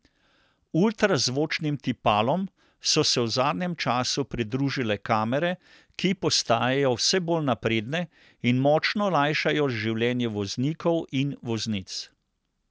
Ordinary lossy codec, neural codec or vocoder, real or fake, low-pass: none; none; real; none